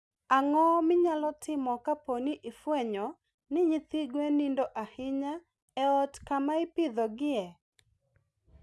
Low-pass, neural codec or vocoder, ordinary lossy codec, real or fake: none; none; none; real